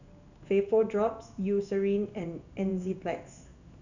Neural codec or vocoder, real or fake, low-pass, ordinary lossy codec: codec, 16 kHz in and 24 kHz out, 1 kbps, XY-Tokenizer; fake; 7.2 kHz; none